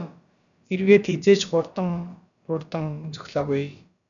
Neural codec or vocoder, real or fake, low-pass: codec, 16 kHz, about 1 kbps, DyCAST, with the encoder's durations; fake; 7.2 kHz